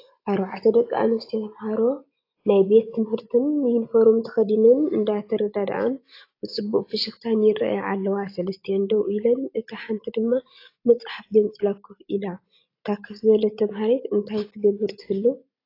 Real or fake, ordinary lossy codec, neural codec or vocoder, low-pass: real; AAC, 32 kbps; none; 5.4 kHz